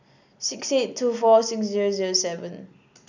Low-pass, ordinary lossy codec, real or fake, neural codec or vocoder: 7.2 kHz; none; real; none